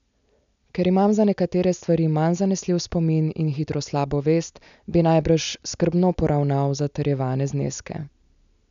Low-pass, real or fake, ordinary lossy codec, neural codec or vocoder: 7.2 kHz; real; none; none